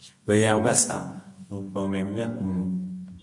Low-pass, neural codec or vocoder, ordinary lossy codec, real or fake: 10.8 kHz; codec, 24 kHz, 0.9 kbps, WavTokenizer, medium music audio release; MP3, 48 kbps; fake